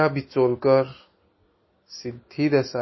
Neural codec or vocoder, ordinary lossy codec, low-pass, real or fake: none; MP3, 24 kbps; 7.2 kHz; real